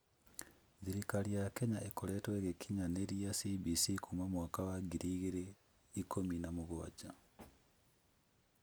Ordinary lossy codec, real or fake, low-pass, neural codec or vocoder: none; real; none; none